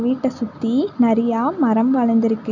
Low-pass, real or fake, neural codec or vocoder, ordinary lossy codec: 7.2 kHz; real; none; none